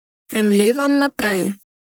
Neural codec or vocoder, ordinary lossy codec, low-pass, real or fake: codec, 44.1 kHz, 1.7 kbps, Pupu-Codec; none; none; fake